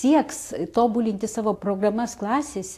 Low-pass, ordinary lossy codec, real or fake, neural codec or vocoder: 14.4 kHz; AAC, 64 kbps; real; none